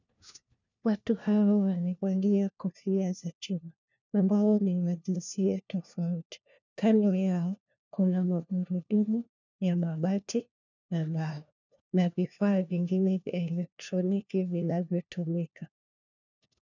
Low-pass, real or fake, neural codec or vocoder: 7.2 kHz; fake; codec, 16 kHz, 1 kbps, FunCodec, trained on LibriTTS, 50 frames a second